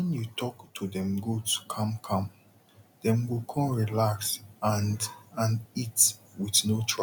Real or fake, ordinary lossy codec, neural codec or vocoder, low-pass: real; none; none; 19.8 kHz